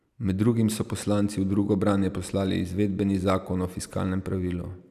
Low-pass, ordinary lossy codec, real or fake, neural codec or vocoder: 14.4 kHz; none; real; none